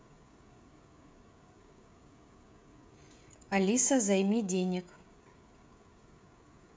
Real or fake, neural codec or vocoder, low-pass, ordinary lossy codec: fake; codec, 16 kHz, 16 kbps, FreqCodec, smaller model; none; none